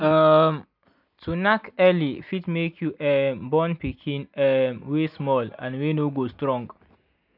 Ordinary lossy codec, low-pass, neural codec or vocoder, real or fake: none; 5.4 kHz; none; real